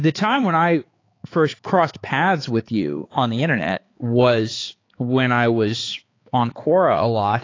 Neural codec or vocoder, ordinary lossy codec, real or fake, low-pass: codec, 16 kHz, 4 kbps, X-Codec, HuBERT features, trained on balanced general audio; AAC, 32 kbps; fake; 7.2 kHz